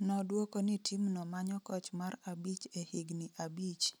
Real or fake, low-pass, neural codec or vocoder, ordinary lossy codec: real; none; none; none